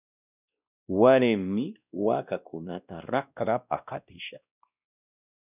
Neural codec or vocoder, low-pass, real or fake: codec, 16 kHz, 1 kbps, X-Codec, WavLM features, trained on Multilingual LibriSpeech; 3.6 kHz; fake